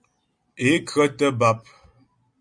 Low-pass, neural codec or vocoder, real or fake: 9.9 kHz; none; real